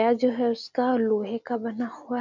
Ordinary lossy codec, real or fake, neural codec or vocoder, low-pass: none; real; none; 7.2 kHz